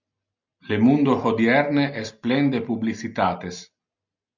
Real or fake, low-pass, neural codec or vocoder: real; 7.2 kHz; none